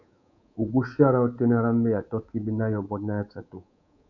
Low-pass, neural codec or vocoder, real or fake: 7.2 kHz; codec, 24 kHz, 3.1 kbps, DualCodec; fake